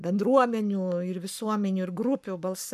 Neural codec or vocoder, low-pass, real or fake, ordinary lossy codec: codec, 44.1 kHz, 7.8 kbps, Pupu-Codec; 14.4 kHz; fake; MP3, 96 kbps